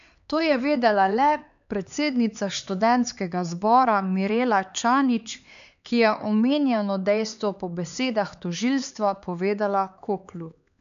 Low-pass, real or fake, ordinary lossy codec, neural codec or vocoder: 7.2 kHz; fake; MP3, 96 kbps; codec, 16 kHz, 4 kbps, X-Codec, HuBERT features, trained on LibriSpeech